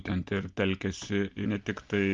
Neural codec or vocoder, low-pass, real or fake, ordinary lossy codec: none; 7.2 kHz; real; Opus, 32 kbps